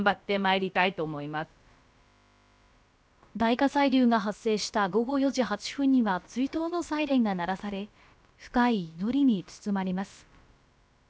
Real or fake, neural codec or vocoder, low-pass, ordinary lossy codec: fake; codec, 16 kHz, about 1 kbps, DyCAST, with the encoder's durations; none; none